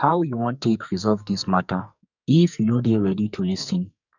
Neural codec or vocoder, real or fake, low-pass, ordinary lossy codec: codec, 44.1 kHz, 2.6 kbps, SNAC; fake; 7.2 kHz; none